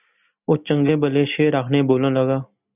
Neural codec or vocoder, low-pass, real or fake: none; 3.6 kHz; real